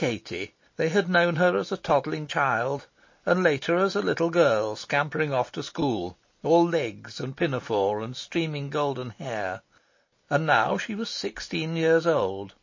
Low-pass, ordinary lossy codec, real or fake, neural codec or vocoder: 7.2 kHz; MP3, 32 kbps; real; none